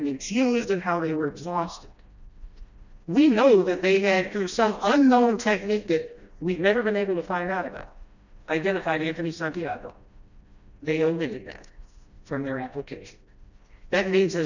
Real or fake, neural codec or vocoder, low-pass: fake; codec, 16 kHz, 1 kbps, FreqCodec, smaller model; 7.2 kHz